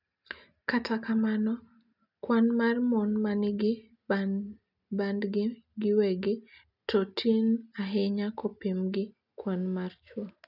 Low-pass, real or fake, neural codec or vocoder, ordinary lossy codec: 5.4 kHz; real; none; none